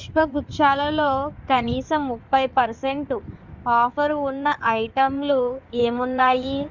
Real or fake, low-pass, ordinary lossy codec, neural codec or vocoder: fake; 7.2 kHz; Opus, 64 kbps; codec, 16 kHz in and 24 kHz out, 2.2 kbps, FireRedTTS-2 codec